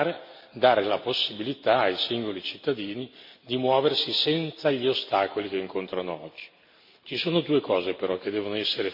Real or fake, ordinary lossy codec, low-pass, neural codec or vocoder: real; MP3, 32 kbps; 5.4 kHz; none